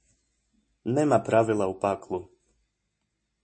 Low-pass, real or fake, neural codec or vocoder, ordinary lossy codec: 9.9 kHz; real; none; MP3, 32 kbps